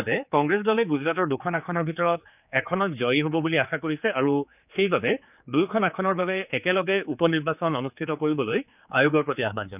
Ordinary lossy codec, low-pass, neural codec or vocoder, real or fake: none; 3.6 kHz; codec, 16 kHz, 4 kbps, X-Codec, HuBERT features, trained on general audio; fake